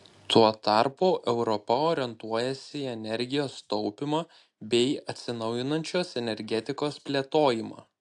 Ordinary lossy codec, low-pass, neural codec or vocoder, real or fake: AAC, 64 kbps; 10.8 kHz; none; real